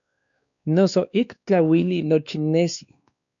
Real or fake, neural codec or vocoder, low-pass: fake; codec, 16 kHz, 2 kbps, X-Codec, WavLM features, trained on Multilingual LibriSpeech; 7.2 kHz